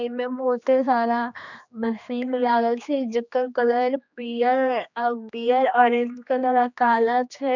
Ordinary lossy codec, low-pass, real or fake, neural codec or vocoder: none; 7.2 kHz; fake; codec, 16 kHz, 2 kbps, X-Codec, HuBERT features, trained on general audio